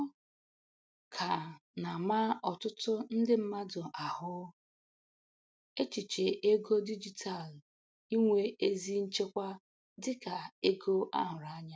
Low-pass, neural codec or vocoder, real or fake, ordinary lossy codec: none; none; real; none